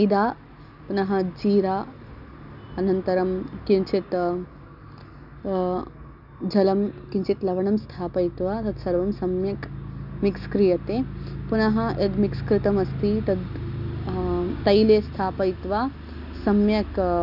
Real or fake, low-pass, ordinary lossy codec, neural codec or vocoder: real; 5.4 kHz; none; none